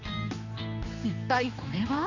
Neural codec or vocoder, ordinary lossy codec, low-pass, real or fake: codec, 16 kHz, 2 kbps, X-Codec, HuBERT features, trained on general audio; none; 7.2 kHz; fake